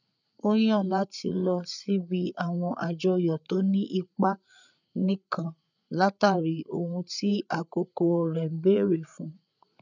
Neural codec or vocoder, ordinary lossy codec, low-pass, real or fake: codec, 16 kHz, 8 kbps, FreqCodec, larger model; none; 7.2 kHz; fake